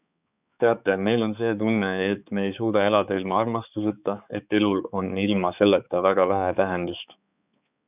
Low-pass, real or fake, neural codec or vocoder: 3.6 kHz; fake; codec, 16 kHz, 4 kbps, X-Codec, HuBERT features, trained on balanced general audio